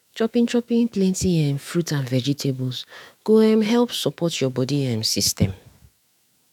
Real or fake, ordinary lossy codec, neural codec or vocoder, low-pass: fake; none; autoencoder, 48 kHz, 128 numbers a frame, DAC-VAE, trained on Japanese speech; 19.8 kHz